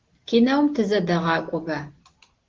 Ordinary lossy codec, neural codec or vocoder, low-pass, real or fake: Opus, 16 kbps; none; 7.2 kHz; real